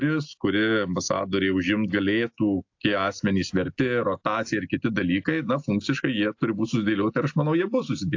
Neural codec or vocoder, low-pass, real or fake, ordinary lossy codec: none; 7.2 kHz; real; AAC, 48 kbps